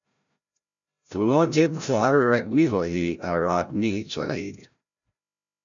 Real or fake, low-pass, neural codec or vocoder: fake; 7.2 kHz; codec, 16 kHz, 0.5 kbps, FreqCodec, larger model